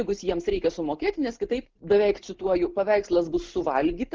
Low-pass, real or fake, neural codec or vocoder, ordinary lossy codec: 7.2 kHz; real; none; Opus, 16 kbps